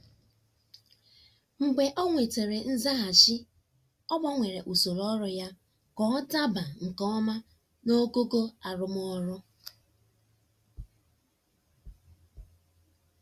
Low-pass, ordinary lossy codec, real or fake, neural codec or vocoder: 14.4 kHz; Opus, 64 kbps; real; none